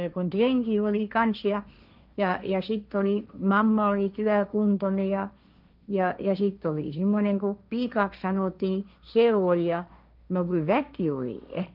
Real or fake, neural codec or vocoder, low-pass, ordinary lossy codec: fake; codec, 16 kHz, 1.1 kbps, Voila-Tokenizer; 5.4 kHz; none